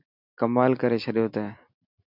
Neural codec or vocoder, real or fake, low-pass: none; real; 5.4 kHz